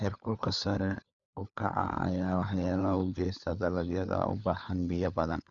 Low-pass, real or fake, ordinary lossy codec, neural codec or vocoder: 7.2 kHz; fake; none; codec, 16 kHz, 4 kbps, FunCodec, trained on LibriTTS, 50 frames a second